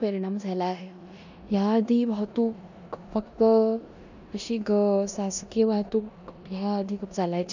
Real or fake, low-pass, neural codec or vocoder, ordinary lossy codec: fake; 7.2 kHz; codec, 16 kHz in and 24 kHz out, 0.9 kbps, LongCat-Audio-Codec, four codebook decoder; none